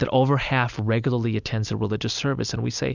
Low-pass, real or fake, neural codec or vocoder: 7.2 kHz; real; none